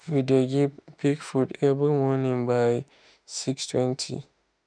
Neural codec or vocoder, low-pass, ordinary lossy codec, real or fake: autoencoder, 48 kHz, 32 numbers a frame, DAC-VAE, trained on Japanese speech; 9.9 kHz; none; fake